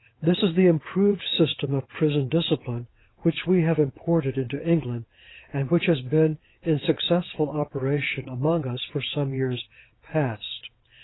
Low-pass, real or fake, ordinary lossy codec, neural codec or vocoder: 7.2 kHz; fake; AAC, 16 kbps; vocoder, 22.05 kHz, 80 mel bands, WaveNeXt